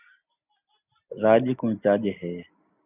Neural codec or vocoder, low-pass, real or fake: none; 3.6 kHz; real